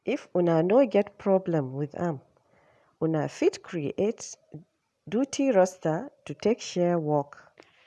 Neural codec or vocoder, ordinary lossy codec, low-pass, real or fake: none; none; none; real